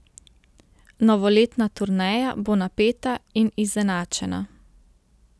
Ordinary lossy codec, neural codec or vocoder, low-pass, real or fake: none; none; none; real